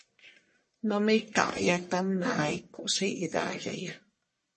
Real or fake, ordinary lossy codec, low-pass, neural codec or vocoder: fake; MP3, 32 kbps; 10.8 kHz; codec, 44.1 kHz, 1.7 kbps, Pupu-Codec